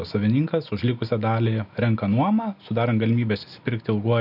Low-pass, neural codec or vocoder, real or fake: 5.4 kHz; none; real